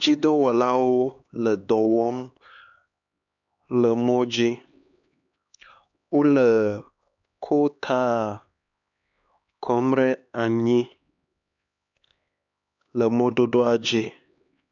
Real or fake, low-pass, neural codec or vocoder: fake; 7.2 kHz; codec, 16 kHz, 2 kbps, X-Codec, HuBERT features, trained on LibriSpeech